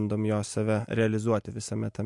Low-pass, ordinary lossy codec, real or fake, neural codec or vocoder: 10.8 kHz; MP3, 64 kbps; real; none